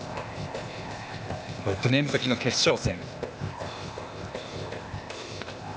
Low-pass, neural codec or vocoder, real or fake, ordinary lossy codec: none; codec, 16 kHz, 0.8 kbps, ZipCodec; fake; none